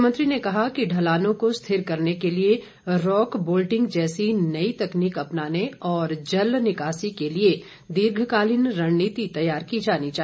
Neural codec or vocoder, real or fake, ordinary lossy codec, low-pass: none; real; none; none